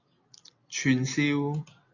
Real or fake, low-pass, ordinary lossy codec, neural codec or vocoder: real; 7.2 kHz; AAC, 48 kbps; none